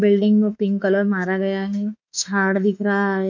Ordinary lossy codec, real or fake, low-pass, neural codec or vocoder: none; fake; 7.2 kHz; autoencoder, 48 kHz, 32 numbers a frame, DAC-VAE, trained on Japanese speech